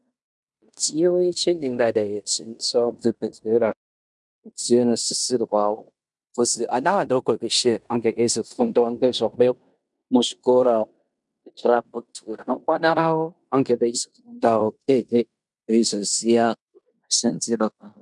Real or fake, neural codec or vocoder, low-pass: fake; codec, 16 kHz in and 24 kHz out, 0.9 kbps, LongCat-Audio-Codec, fine tuned four codebook decoder; 10.8 kHz